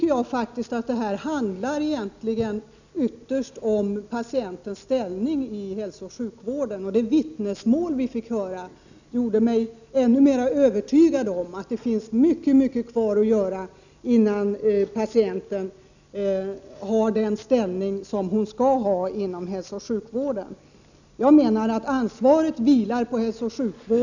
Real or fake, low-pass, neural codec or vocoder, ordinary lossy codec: real; 7.2 kHz; none; none